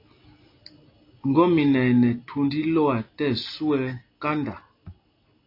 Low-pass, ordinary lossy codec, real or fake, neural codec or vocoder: 5.4 kHz; AAC, 32 kbps; real; none